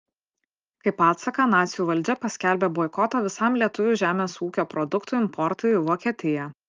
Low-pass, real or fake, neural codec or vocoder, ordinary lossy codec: 7.2 kHz; real; none; Opus, 24 kbps